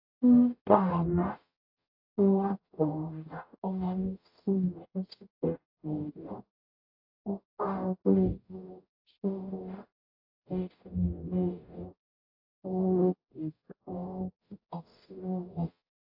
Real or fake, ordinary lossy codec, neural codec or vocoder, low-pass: fake; AAC, 48 kbps; codec, 44.1 kHz, 0.9 kbps, DAC; 5.4 kHz